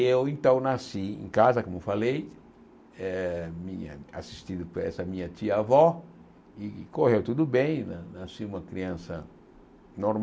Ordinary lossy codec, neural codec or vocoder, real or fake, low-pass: none; none; real; none